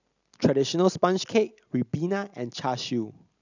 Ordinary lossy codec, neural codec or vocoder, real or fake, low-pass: none; none; real; 7.2 kHz